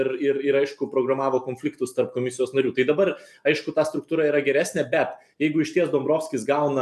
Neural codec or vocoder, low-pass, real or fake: none; 14.4 kHz; real